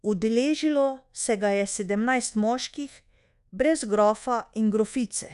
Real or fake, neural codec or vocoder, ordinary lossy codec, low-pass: fake; codec, 24 kHz, 1.2 kbps, DualCodec; none; 10.8 kHz